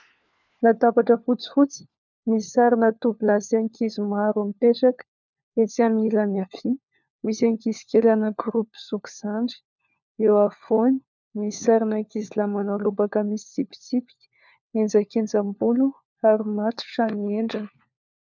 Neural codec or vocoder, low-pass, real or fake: codec, 16 kHz, 4 kbps, FunCodec, trained on LibriTTS, 50 frames a second; 7.2 kHz; fake